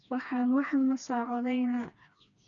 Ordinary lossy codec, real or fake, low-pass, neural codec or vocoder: none; fake; 7.2 kHz; codec, 16 kHz, 2 kbps, FreqCodec, smaller model